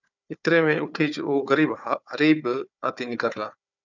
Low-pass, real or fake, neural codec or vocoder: 7.2 kHz; fake; codec, 16 kHz, 4 kbps, FunCodec, trained on Chinese and English, 50 frames a second